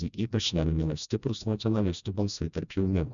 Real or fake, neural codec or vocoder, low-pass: fake; codec, 16 kHz, 1 kbps, FreqCodec, smaller model; 7.2 kHz